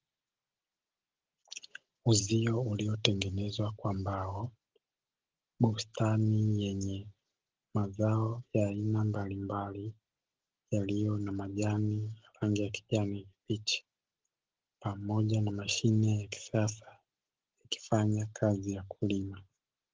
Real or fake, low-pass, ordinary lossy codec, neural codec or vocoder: real; 7.2 kHz; Opus, 16 kbps; none